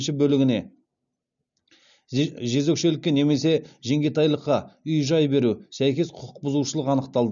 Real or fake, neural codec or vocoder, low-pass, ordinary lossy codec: real; none; 7.2 kHz; none